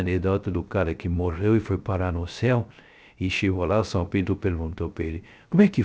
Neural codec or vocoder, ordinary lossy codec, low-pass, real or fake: codec, 16 kHz, 0.3 kbps, FocalCodec; none; none; fake